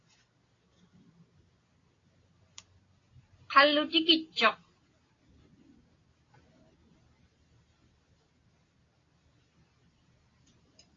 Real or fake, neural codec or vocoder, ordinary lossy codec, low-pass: real; none; AAC, 32 kbps; 7.2 kHz